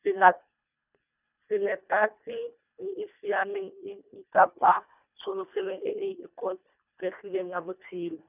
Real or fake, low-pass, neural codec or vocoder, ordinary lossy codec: fake; 3.6 kHz; codec, 24 kHz, 1.5 kbps, HILCodec; none